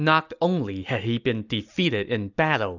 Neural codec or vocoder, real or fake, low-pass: none; real; 7.2 kHz